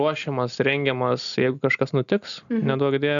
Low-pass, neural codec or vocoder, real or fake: 7.2 kHz; none; real